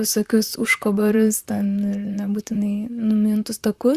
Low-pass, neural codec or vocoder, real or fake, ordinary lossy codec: 14.4 kHz; vocoder, 44.1 kHz, 128 mel bands, Pupu-Vocoder; fake; Opus, 64 kbps